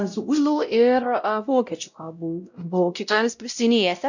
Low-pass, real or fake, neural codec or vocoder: 7.2 kHz; fake; codec, 16 kHz, 0.5 kbps, X-Codec, WavLM features, trained on Multilingual LibriSpeech